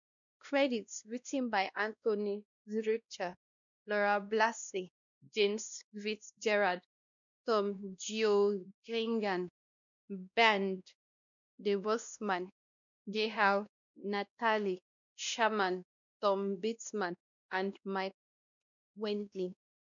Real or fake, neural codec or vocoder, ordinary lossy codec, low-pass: fake; codec, 16 kHz, 1 kbps, X-Codec, WavLM features, trained on Multilingual LibriSpeech; none; 7.2 kHz